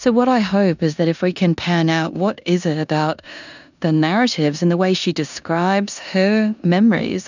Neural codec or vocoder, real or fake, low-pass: codec, 16 kHz in and 24 kHz out, 0.9 kbps, LongCat-Audio-Codec, four codebook decoder; fake; 7.2 kHz